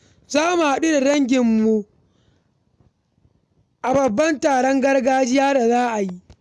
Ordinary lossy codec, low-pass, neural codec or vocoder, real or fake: none; none; none; real